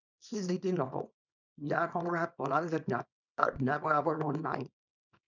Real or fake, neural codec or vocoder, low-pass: fake; codec, 24 kHz, 0.9 kbps, WavTokenizer, small release; 7.2 kHz